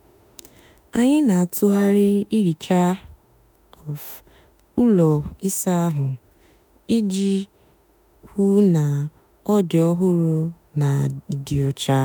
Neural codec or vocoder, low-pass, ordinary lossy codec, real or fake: autoencoder, 48 kHz, 32 numbers a frame, DAC-VAE, trained on Japanese speech; none; none; fake